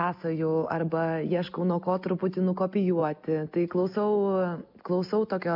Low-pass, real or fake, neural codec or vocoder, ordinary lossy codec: 5.4 kHz; real; none; MP3, 48 kbps